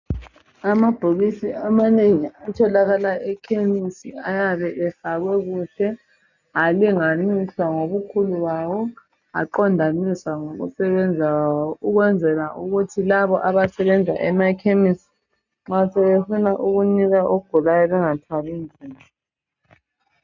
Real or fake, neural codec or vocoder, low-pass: real; none; 7.2 kHz